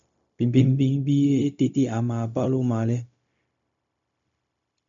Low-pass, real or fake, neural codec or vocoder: 7.2 kHz; fake; codec, 16 kHz, 0.4 kbps, LongCat-Audio-Codec